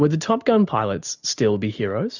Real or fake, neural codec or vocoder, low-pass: real; none; 7.2 kHz